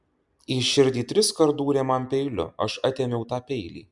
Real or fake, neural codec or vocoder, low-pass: real; none; 10.8 kHz